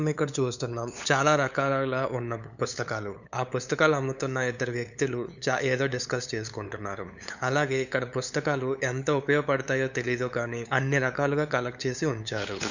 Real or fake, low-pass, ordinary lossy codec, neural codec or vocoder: fake; 7.2 kHz; none; codec, 16 kHz, 8 kbps, FunCodec, trained on LibriTTS, 25 frames a second